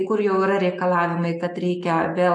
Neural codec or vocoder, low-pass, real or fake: none; 10.8 kHz; real